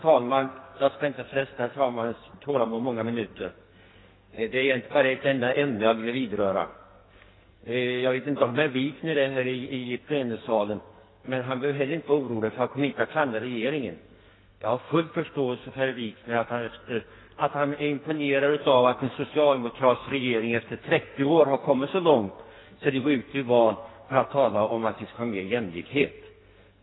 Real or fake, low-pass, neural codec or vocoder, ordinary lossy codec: fake; 7.2 kHz; codec, 44.1 kHz, 2.6 kbps, SNAC; AAC, 16 kbps